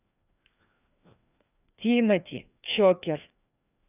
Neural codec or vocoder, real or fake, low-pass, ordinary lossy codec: codec, 16 kHz, 2 kbps, FreqCodec, larger model; fake; 3.6 kHz; none